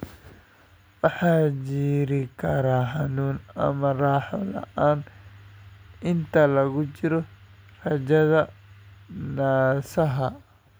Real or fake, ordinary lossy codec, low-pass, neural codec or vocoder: real; none; none; none